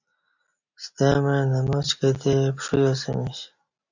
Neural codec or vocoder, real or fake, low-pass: none; real; 7.2 kHz